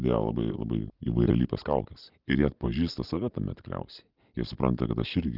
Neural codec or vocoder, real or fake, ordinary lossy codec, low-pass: none; real; Opus, 32 kbps; 5.4 kHz